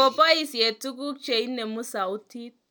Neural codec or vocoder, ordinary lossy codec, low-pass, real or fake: none; none; none; real